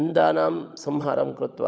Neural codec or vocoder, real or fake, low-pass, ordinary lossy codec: codec, 16 kHz, 16 kbps, FunCodec, trained on LibriTTS, 50 frames a second; fake; none; none